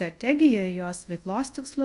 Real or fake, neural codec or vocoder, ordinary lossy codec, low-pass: fake; codec, 24 kHz, 0.5 kbps, DualCodec; AAC, 64 kbps; 10.8 kHz